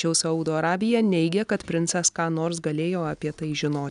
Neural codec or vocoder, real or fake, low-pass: none; real; 10.8 kHz